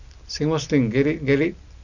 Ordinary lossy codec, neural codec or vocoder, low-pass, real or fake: none; none; 7.2 kHz; real